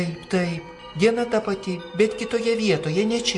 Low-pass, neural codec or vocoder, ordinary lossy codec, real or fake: 10.8 kHz; none; MP3, 48 kbps; real